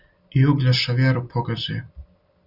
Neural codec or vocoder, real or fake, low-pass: none; real; 5.4 kHz